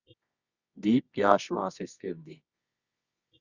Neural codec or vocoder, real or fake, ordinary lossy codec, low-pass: codec, 24 kHz, 0.9 kbps, WavTokenizer, medium music audio release; fake; Opus, 64 kbps; 7.2 kHz